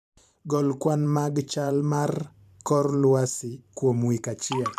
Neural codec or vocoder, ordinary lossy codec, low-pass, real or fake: vocoder, 44.1 kHz, 128 mel bands every 512 samples, BigVGAN v2; MP3, 96 kbps; 14.4 kHz; fake